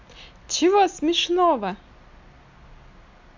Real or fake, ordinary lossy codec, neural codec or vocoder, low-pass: real; MP3, 64 kbps; none; 7.2 kHz